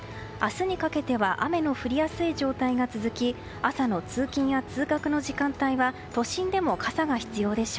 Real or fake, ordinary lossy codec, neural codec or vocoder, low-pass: real; none; none; none